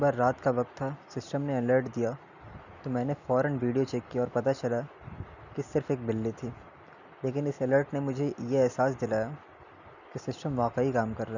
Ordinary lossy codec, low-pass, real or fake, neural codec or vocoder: none; 7.2 kHz; real; none